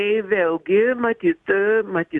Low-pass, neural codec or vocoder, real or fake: 10.8 kHz; none; real